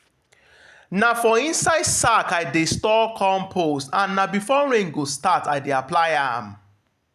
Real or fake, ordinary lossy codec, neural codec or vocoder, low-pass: real; none; none; 14.4 kHz